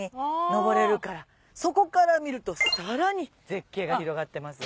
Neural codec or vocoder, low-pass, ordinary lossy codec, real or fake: none; none; none; real